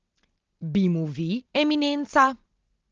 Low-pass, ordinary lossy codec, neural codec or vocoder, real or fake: 7.2 kHz; Opus, 16 kbps; none; real